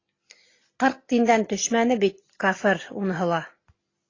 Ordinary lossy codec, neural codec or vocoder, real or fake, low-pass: AAC, 32 kbps; none; real; 7.2 kHz